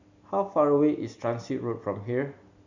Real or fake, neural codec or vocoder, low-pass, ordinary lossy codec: real; none; 7.2 kHz; none